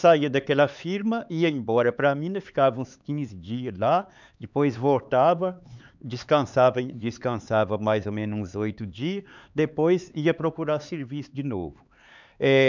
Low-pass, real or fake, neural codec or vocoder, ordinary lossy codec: 7.2 kHz; fake; codec, 16 kHz, 4 kbps, X-Codec, HuBERT features, trained on LibriSpeech; none